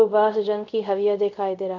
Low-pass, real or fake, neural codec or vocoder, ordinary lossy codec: 7.2 kHz; fake; codec, 24 kHz, 0.5 kbps, DualCodec; none